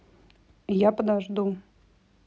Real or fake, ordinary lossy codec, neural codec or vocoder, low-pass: real; none; none; none